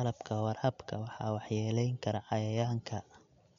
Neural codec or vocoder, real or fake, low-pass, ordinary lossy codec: none; real; 7.2 kHz; MP3, 64 kbps